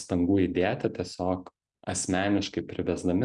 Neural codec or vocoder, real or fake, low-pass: none; real; 10.8 kHz